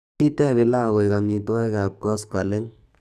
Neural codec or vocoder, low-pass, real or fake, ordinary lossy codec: codec, 44.1 kHz, 2.6 kbps, SNAC; 14.4 kHz; fake; none